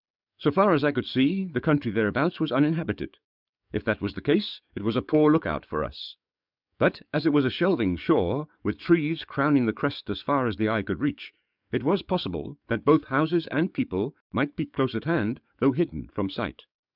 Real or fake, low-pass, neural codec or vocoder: fake; 5.4 kHz; codec, 44.1 kHz, 7.8 kbps, DAC